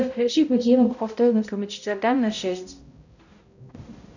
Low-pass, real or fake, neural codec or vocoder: 7.2 kHz; fake; codec, 16 kHz, 0.5 kbps, X-Codec, HuBERT features, trained on balanced general audio